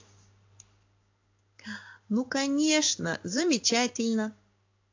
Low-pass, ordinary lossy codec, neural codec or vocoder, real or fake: 7.2 kHz; AAC, 48 kbps; codec, 16 kHz in and 24 kHz out, 1 kbps, XY-Tokenizer; fake